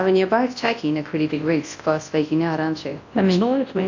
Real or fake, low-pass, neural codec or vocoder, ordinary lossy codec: fake; 7.2 kHz; codec, 24 kHz, 0.9 kbps, WavTokenizer, large speech release; AAC, 48 kbps